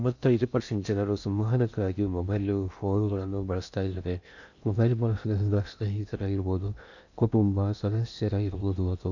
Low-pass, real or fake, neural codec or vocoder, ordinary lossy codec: 7.2 kHz; fake; codec, 16 kHz in and 24 kHz out, 0.6 kbps, FocalCodec, streaming, 4096 codes; none